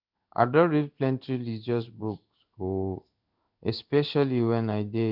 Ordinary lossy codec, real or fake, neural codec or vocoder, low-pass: none; fake; codec, 16 kHz in and 24 kHz out, 1 kbps, XY-Tokenizer; 5.4 kHz